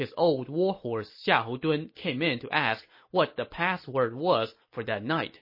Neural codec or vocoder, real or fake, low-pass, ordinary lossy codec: none; real; 5.4 kHz; MP3, 24 kbps